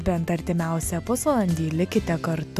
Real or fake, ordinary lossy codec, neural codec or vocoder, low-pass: real; AAC, 96 kbps; none; 14.4 kHz